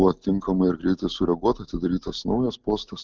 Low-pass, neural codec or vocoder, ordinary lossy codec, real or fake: 7.2 kHz; none; Opus, 24 kbps; real